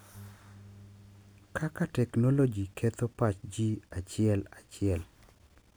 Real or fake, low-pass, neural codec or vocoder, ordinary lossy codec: real; none; none; none